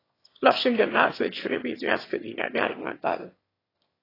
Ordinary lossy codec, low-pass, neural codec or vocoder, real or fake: AAC, 24 kbps; 5.4 kHz; autoencoder, 22.05 kHz, a latent of 192 numbers a frame, VITS, trained on one speaker; fake